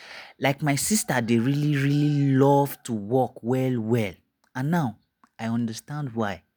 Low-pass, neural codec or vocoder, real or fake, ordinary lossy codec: none; none; real; none